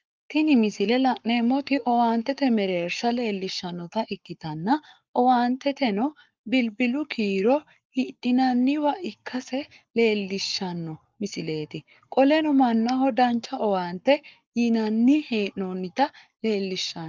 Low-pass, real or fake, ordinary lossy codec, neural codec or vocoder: 7.2 kHz; fake; Opus, 24 kbps; codec, 44.1 kHz, 7.8 kbps, Pupu-Codec